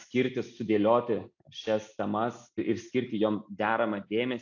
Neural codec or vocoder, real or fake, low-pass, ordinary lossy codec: none; real; 7.2 kHz; AAC, 48 kbps